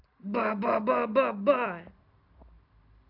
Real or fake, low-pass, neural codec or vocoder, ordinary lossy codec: real; 5.4 kHz; none; MP3, 48 kbps